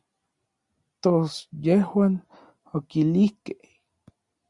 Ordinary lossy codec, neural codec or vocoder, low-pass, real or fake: Opus, 64 kbps; none; 10.8 kHz; real